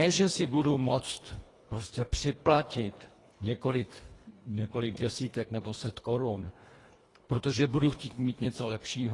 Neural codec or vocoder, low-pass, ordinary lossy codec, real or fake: codec, 24 kHz, 1.5 kbps, HILCodec; 10.8 kHz; AAC, 32 kbps; fake